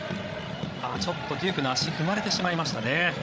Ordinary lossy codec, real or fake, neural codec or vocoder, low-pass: none; fake; codec, 16 kHz, 16 kbps, FreqCodec, larger model; none